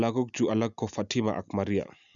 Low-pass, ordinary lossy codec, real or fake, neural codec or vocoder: 7.2 kHz; none; real; none